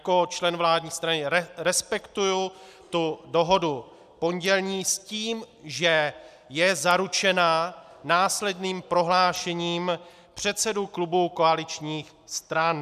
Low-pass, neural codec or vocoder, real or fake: 14.4 kHz; none; real